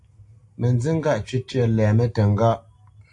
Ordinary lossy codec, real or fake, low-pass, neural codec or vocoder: AAC, 64 kbps; real; 10.8 kHz; none